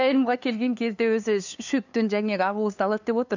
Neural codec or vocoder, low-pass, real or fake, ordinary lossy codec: codec, 16 kHz, 2 kbps, FunCodec, trained on LibriTTS, 25 frames a second; 7.2 kHz; fake; none